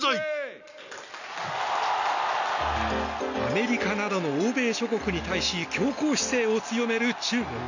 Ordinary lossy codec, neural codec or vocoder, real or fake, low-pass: none; none; real; 7.2 kHz